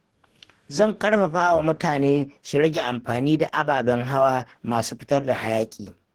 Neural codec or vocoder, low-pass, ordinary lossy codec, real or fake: codec, 44.1 kHz, 2.6 kbps, DAC; 19.8 kHz; Opus, 16 kbps; fake